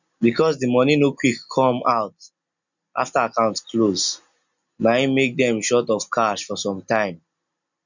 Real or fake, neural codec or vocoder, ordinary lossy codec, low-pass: real; none; none; 7.2 kHz